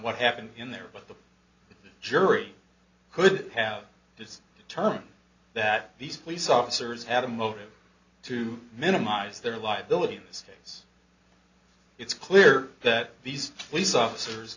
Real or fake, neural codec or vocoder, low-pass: real; none; 7.2 kHz